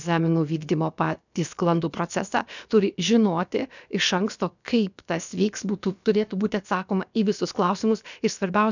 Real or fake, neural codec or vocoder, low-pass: fake; codec, 16 kHz, about 1 kbps, DyCAST, with the encoder's durations; 7.2 kHz